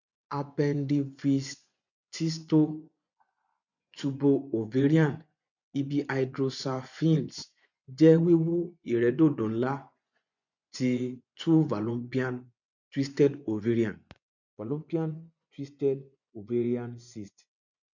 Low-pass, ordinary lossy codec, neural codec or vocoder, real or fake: 7.2 kHz; none; vocoder, 24 kHz, 100 mel bands, Vocos; fake